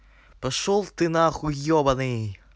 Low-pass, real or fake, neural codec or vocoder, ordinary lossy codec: none; real; none; none